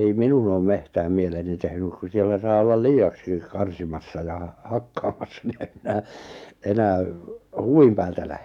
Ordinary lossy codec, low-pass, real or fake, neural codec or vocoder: none; 19.8 kHz; fake; codec, 44.1 kHz, 7.8 kbps, DAC